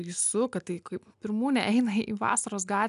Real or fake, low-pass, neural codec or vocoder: real; 10.8 kHz; none